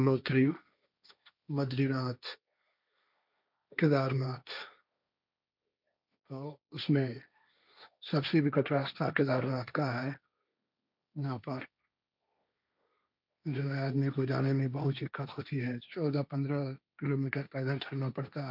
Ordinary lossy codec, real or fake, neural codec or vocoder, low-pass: none; fake; codec, 16 kHz, 1.1 kbps, Voila-Tokenizer; 5.4 kHz